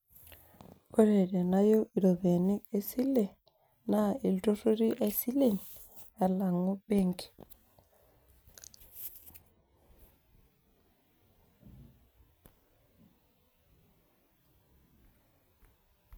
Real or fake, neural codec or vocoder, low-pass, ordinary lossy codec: real; none; none; none